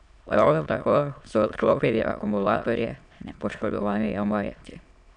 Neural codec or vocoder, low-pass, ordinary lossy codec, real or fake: autoencoder, 22.05 kHz, a latent of 192 numbers a frame, VITS, trained on many speakers; 9.9 kHz; none; fake